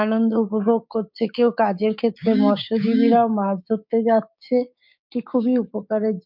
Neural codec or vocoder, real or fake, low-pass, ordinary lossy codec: codec, 16 kHz, 6 kbps, DAC; fake; 5.4 kHz; MP3, 48 kbps